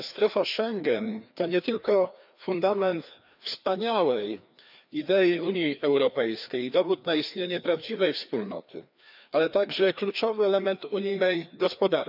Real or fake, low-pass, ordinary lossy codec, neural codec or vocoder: fake; 5.4 kHz; none; codec, 16 kHz, 2 kbps, FreqCodec, larger model